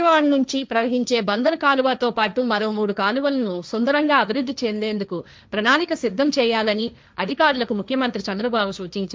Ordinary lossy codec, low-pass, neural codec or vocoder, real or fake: none; none; codec, 16 kHz, 1.1 kbps, Voila-Tokenizer; fake